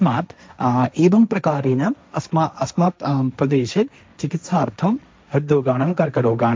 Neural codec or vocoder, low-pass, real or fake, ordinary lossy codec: codec, 16 kHz, 1.1 kbps, Voila-Tokenizer; none; fake; none